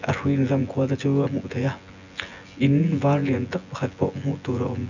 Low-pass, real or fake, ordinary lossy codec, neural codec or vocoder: 7.2 kHz; fake; none; vocoder, 24 kHz, 100 mel bands, Vocos